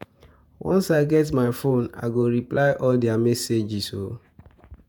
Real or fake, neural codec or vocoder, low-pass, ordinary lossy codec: fake; vocoder, 48 kHz, 128 mel bands, Vocos; none; none